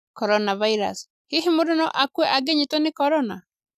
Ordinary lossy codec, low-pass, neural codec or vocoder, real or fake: none; 14.4 kHz; none; real